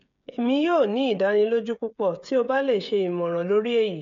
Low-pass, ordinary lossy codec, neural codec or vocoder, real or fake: 7.2 kHz; none; codec, 16 kHz, 16 kbps, FreqCodec, smaller model; fake